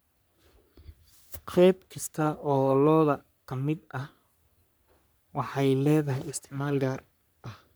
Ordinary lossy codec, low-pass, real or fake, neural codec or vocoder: none; none; fake; codec, 44.1 kHz, 3.4 kbps, Pupu-Codec